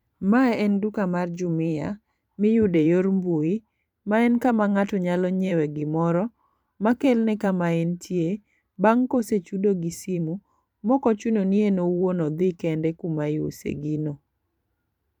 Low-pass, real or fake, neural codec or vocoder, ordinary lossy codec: 19.8 kHz; fake; vocoder, 44.1 kHz, 128 mel bands every 512 samples, BigVGAN v2; none